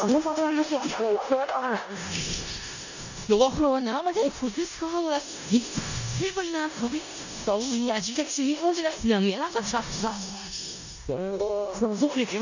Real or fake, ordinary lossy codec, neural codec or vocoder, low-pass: fake; none; codec, 16 kHz in and 24 kHz out, 0.4 kbps, LongCat-Audio-Codec, four codebook decoder; 7.2 kHz